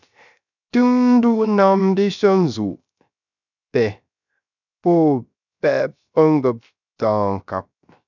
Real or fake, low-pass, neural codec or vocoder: fake; 7.2 kHz; codec, 16 kHz, 0.3 kbps, FocalCodec